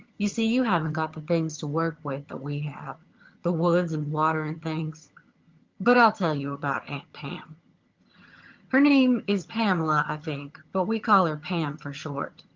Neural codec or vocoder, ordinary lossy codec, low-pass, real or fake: vocoder, 22.05 kHz, 80 mel bands, HiFi-GAN; Opus, 32 kbps; 7.2 kHz; fake